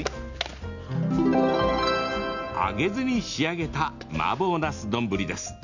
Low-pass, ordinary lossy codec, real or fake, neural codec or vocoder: 7.2 kHz; none; real; none